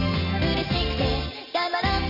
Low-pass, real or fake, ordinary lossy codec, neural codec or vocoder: 5.4 kHz; real; none; none